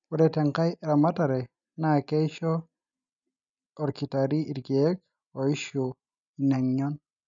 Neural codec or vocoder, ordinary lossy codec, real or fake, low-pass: none; none; real; 7.2 kHz